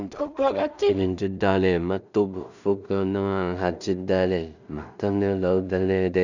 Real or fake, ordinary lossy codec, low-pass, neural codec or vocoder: fake; none; 7.2 kHz; codec, 16 kHz in and 24 kHz out, 0.4 kbps, LongCat-Audio-Codec, two codebook decoder